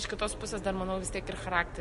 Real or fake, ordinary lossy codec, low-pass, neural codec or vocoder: real; MP3, 48 kbps; 14.4 kHz; none